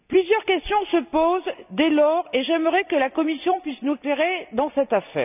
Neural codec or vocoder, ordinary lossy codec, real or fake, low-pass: none; none; real; 3.6 kHz